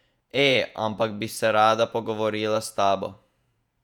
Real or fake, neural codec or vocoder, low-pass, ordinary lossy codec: fake; vocoder, 48 kHz, 128 mel bands, Vocos; 19.8 kHz; none